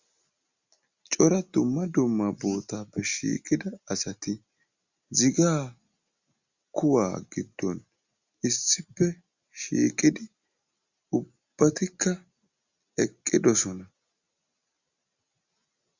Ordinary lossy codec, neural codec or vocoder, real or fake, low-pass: Opus, 64 kbps; none; real; 7.2 kHz